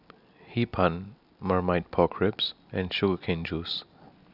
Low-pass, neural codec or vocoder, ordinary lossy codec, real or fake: 5.4 kHz; none; none; real